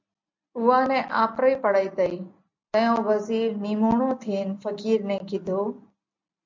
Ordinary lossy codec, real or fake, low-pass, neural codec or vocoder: MP3, 64 kbps; real; 7.2 kHz; none